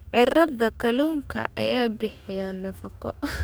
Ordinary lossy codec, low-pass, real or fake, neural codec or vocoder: none; none; fake; codec, 44.1 kHz, 2.6 kbps, DAC